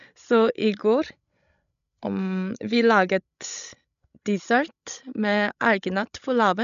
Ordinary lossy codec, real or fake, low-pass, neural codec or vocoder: none; fake; 7.2 kHz; codec, 16 kHz, 16 kbps, FreqCodec, larger model